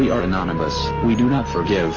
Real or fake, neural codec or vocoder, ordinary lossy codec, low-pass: real; none; MP3, 64 kbps; 7.2 kHz